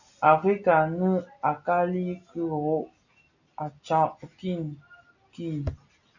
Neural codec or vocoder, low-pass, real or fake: none; 7.2 kHz; real